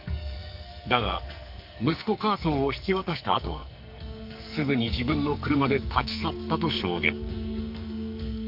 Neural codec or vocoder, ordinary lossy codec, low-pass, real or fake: codec, 44.1 kHz, 2.6 kbps, SNAC; none; 5.4 kHz; fake